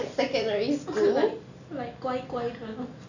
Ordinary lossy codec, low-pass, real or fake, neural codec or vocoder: none; 7.2 kHz; real; none